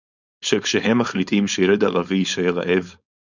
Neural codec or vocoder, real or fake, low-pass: codec, 16 kHz, 4.8 kbps, FACodec; fake; 7.2 kHz